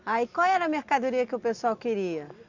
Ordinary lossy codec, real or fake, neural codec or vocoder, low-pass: Opus, 64 kbps; real; none; 7.2 kHz